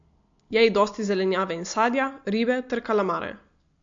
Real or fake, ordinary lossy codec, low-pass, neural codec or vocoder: real; MP3, 48 kbps; 7.2 kHz; none